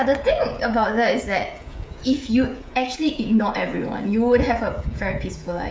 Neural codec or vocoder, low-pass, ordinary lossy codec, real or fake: codec, 16 kHz, 16 kbps, FreqCodec, smaller model; none; none; fake